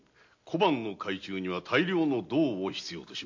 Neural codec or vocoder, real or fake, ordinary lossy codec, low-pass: none; real; MP3, 48 kbps; 7.2 kHz